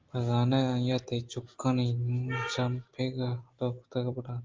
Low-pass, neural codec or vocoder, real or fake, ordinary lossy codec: 7.2 kHz; none; real; Opus, 32 kbps